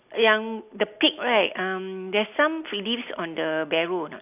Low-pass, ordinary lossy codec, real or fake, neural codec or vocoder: 3.6 kHz; AAC, 32 kbps; real; none